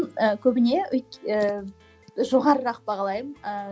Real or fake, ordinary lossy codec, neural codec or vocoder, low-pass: real; none; none; none